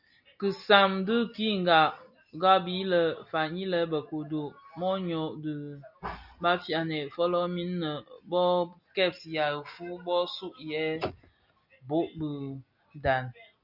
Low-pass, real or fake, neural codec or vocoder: 5.4 kHz; real; none